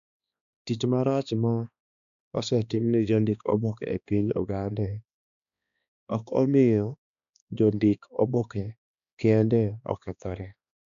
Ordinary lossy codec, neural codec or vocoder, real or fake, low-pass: none; codec, 16 kHz, 2 kbps, X-Codec, HuBERT features, trained on balanced general audio; fake; 7.2 kHz